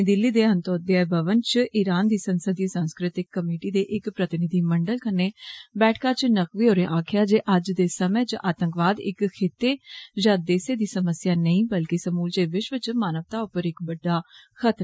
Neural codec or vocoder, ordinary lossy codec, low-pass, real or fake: none; none; none; real